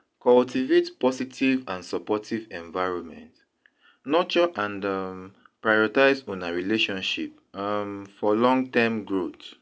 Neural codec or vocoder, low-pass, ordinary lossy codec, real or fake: none; none; none; real